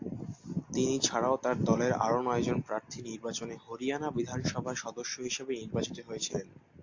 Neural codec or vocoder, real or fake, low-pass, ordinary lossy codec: none; real; 7.2 kHz; AAC, 48 kbps